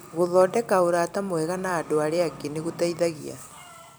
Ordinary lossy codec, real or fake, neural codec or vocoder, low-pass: none; fake; vocoder, 44.1 kHz, 128 mel bands every 256 samples, BigVGAN v2; none